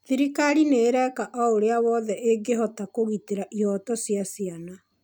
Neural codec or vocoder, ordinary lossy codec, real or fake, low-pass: none; none; real; none